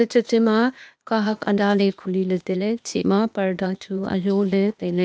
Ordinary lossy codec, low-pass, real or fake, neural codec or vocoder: none; none; fake; codec, 16 kHz, 0.8 kbps, ZipCodec